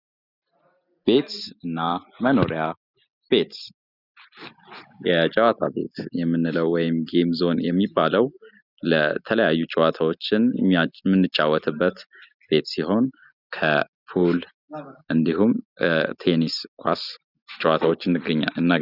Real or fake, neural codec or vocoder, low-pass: real; none; 5.4 kHz